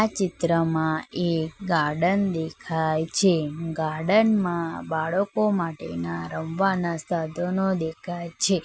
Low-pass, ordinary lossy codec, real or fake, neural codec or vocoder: none; none; real; none